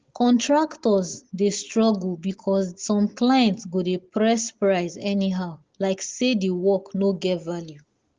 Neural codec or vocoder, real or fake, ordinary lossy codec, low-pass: none; real; Opus, 16 kbps; 7.2 kHz